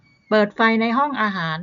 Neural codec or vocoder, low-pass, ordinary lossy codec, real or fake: none; 7.2 kHz; none; real